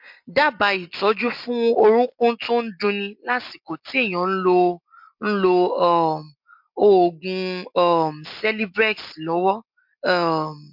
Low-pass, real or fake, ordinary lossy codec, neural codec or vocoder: 5.4 kHz; real; MP3, 48 kbps; none